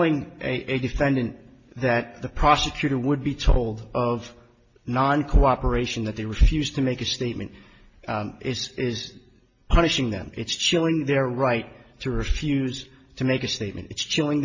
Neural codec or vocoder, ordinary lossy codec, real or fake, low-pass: none; MP3, 32 kbps; real; 7.2 kHz